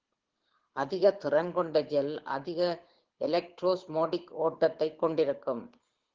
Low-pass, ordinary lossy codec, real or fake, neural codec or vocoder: 7.2 kHz; Opus, 16 kbps; fake; codec, 24 kHz, 6 kbps, HILCodec